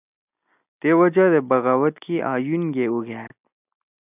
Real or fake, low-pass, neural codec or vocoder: real; 3.6 kHz; none